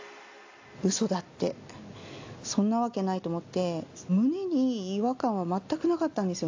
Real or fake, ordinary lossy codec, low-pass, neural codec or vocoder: real; AAC, 48 kbps; 7.2 kHz; none